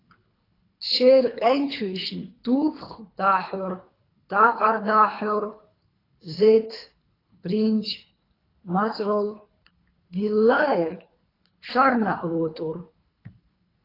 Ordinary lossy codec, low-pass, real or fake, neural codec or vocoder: AAC, 24 kbps; 5.4 kHz; fake; codec, 24 kHz, 3 kbps, HILCodec